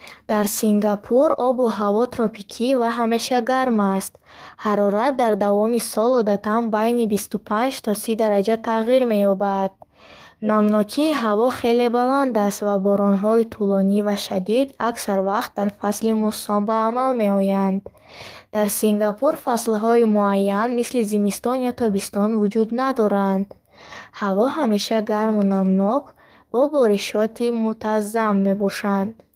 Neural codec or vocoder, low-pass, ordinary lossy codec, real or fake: codec, 32 kHz, 1.9 kbps, SNAC; 14.4 kHz; Opus, 32 kbps; fake